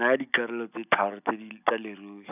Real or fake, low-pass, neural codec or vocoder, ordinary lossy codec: real; 3.6 kHz; none; none